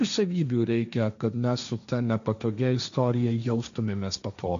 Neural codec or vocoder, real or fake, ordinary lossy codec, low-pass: codec, 16 kHz, 1.1 kbps, Voila-Tokenizer; fake; AAC, 96 kbps; 7.2 kHz